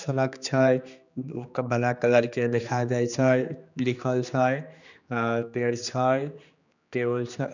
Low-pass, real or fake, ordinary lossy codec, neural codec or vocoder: 7.2 kHz; fake; none; codec, 16 kHz, 2 kbps, X-Codec, HuBERT features, trained on general audio